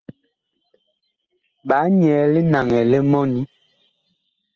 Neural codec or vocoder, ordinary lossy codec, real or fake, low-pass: none; Opus, 16 kbps; real; 7.2 kHz